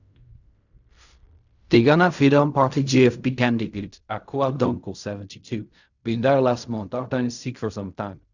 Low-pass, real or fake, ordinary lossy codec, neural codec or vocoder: 7.2 kHz; fake; none; codec, 16 kHz in and 24 kHz out, 0.4 kbps, LongCat-Audio-Codec, fine tuned four codebook decoder